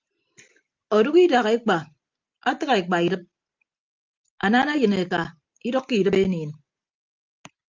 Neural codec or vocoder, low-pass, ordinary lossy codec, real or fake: none; 7.2 kHz; Opus, 24 kbps; real